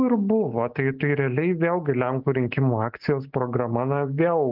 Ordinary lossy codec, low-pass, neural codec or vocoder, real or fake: Opus, 24 kbps; 5.4 kHz; none; real